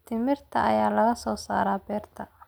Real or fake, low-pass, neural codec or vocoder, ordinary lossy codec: real; none; none; none